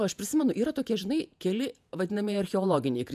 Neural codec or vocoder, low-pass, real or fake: vocoder, 48 kHz, 128 mel bands, Vocos; 14.4 kHz; fake